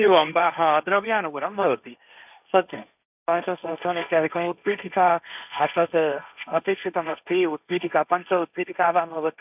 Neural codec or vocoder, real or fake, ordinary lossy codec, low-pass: codec, 16 kHz, 1.1 kbps, Voila-Tokenizer; fake; none; 3.6 kHz